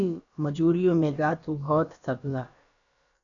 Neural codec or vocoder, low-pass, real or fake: codec, 16 kHz, about 1 kbps, DyCAST, with the encoder's durations; 7.2 kHz; fake